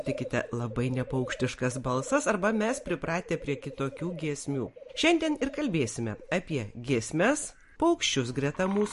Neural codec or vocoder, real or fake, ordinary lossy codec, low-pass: none; real; MP3, 48 kbps; 14.4 kHz